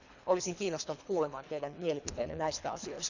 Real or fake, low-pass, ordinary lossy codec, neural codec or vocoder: fake; 7.2 kHz; none; codec, 24 kHz, 3 kbps, HILCodec